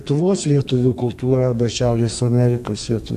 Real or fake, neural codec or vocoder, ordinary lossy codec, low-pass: fake; codec, 32 kHz, 1.9 kbps, SNAC; AAC, 96 kbps; 14.4 kHz